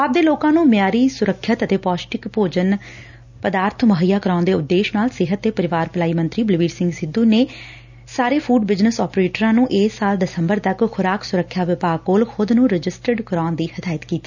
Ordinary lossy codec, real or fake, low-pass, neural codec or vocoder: none; real; 7.2 kHz; none